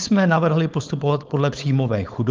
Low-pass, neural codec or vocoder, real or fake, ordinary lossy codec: 7.2 kHz; codec, 16 kHz, 4.8 kbps, FACodec; fake; Opus, 24 kbps